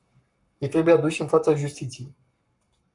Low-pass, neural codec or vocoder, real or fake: 10.8 kHz; codec, 44.1 kHz, 7.8 kbps, Pupu-Codec; fake